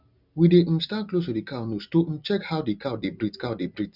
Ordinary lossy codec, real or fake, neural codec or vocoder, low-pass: none; real; none; 5.4 kHz